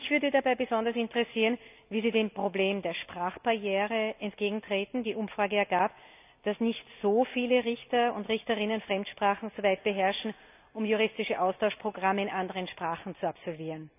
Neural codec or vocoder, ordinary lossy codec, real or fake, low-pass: none; none; real; 3.6 kHz